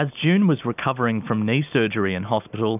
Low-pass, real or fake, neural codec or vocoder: 3.6 kHz; real; none